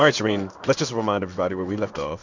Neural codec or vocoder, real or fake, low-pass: codec, 16 kHz in and 24 kHz out, 1 kbps, XY-Tokenizer; fake; 7.2 kHz